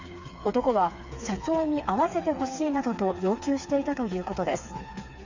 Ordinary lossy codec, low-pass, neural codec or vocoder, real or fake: Opus, 64 kbps; 7.2 kHz; codec, 16 kHz, 4 kbps, FreqCodec, smaller model; fake